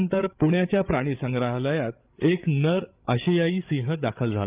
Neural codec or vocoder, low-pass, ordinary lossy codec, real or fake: codec, 16 kHz, 8 kbps, FreqCodec, larger model; 3.6 kHz; Opus, 32 kbps; fake